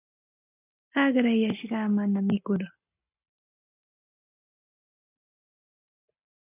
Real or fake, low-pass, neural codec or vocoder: real; 3.6 kHz; none